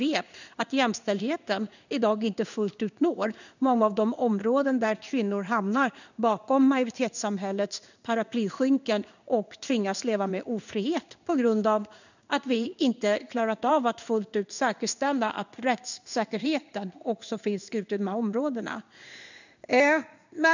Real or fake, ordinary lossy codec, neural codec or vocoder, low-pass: fake; none; codec, 16 kHz in and 24 kHz out, 1 kbps, XY-Tokenizer; 7.2 kHz